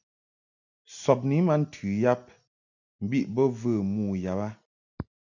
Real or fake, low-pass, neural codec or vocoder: real; 7.2 kHz; none